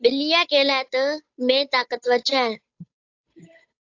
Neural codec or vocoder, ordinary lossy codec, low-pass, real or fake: codec, 16 kHz, 8 kbps, FunCodec, trained on Chinese and English, 25 frames a second; AAC, 48 kbps; 7.2 kHz; fake